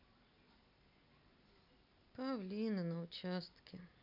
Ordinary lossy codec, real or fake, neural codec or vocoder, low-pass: MP3, 48 kbps; real; none; 5.4 kHz